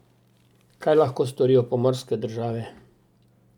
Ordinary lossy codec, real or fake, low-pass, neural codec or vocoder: none; real; 19.8 kHz; none